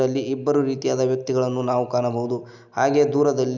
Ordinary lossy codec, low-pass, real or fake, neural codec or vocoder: none; 7.2 kHz; real; none